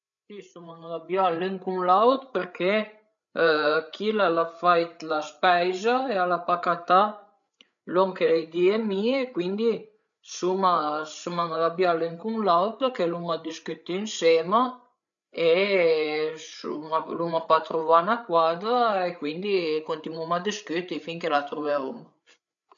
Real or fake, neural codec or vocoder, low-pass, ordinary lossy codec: fake; codec, 16 kHz, 8 kbps, FreqCodec, larger model; 7.2 kHz; none